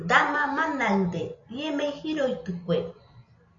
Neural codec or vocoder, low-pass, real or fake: none; 7.2 kHz; real